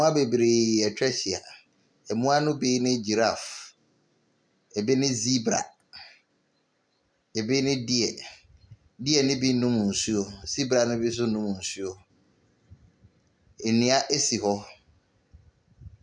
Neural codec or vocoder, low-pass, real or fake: none; 9.9 kHz; real